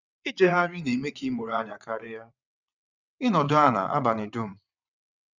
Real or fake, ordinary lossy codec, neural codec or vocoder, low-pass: fake; none; vocoder, 22.05 kHz, 80 mel bands, WaveNeXt; 7.2 kHz